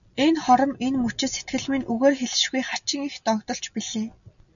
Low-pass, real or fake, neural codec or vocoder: 7.2 kHz; real; none